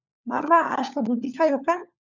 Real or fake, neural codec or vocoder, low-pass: fake; codec, 16 kHz, 4 kbps, FunCodec, trained on LibriTTS, 50 frames a second; 7.2 kHz